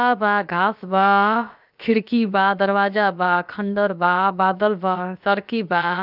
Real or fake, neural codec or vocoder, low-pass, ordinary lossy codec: fake; codec, 16 kHz, about 1 kbps, DyCAST, with the encoder's durations; 5.4 kHz; none